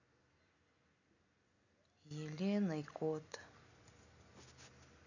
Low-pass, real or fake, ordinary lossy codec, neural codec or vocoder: 7.2 kHz; real; none; none